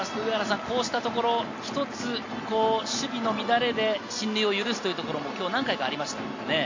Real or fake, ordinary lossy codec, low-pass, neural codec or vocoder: real; AAC, 48 kbps; 7.2 kHz; none